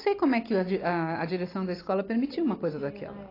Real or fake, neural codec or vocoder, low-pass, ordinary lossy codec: real; none; 5.4 kHz; AAC, 24 kbps